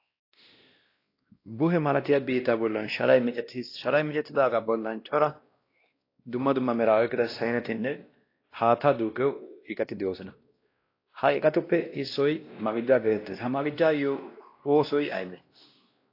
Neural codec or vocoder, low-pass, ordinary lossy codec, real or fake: codec, 16 kHz, 1 kbps, X-Codec, WavLM features, trained on Multilingual LibriSpeech; 5.4 kHz; AAC, 32 kbps; fake